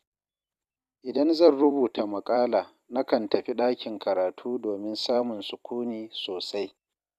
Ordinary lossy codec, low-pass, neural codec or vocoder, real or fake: none; 14.4 kHz; vocoder, 44.1 kHz, 128 mel bands every 256 samples, BigVGAN v2; fake